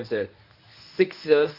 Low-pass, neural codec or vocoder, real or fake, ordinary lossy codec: 5.4 kHz; codec, 24 kHz, 0.9 kbps, WavTokenizer, medium speech release version 1; fake; none